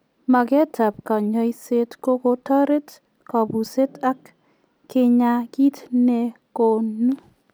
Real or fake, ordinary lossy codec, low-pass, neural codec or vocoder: real; none; none; none